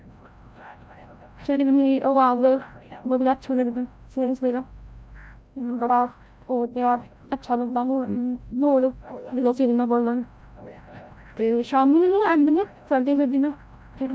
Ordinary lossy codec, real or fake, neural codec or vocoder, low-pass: none; fake; codec, 16 kHz, 0.5 kbps, FreqCodec, larger model; none